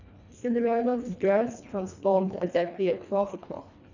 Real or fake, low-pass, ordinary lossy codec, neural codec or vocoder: fake; 7.2 kHz; none; codec, 24 kHz, 1.5 kbps, HILCodec